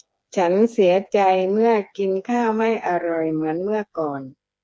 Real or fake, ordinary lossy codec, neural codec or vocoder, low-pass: fake; none; codec, 16 kHz, 4 kbps, FreqCodec, smaller model; none